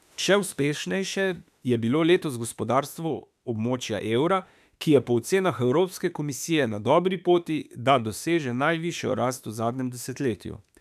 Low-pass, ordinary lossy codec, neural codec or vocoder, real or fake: 14.4 kHz; none; autoencoder, 48 kHz, 32 numbers a frame, DAC-VAE, trained on Japanese speech; fake